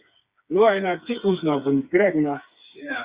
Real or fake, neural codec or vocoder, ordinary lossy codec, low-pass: fake; codec, 16 kHz, 4 kbps, FreqCodec, smaller model; Opus, 64 kbps; 3.6 kHz